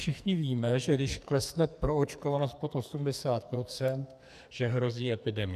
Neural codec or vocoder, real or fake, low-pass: codec, 44.1 kHz, 2.6 kbps, SNAC; fake; 14.4 kHz